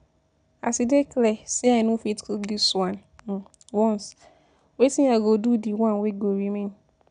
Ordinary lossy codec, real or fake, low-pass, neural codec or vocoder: none; real; 9.9 kHz; none